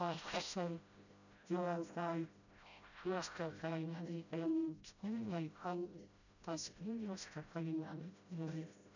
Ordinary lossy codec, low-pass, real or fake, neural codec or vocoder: none; 7.2 kHz; fake; codec, 16 kHz, 0.5 kbps, FreqCodec, smaller model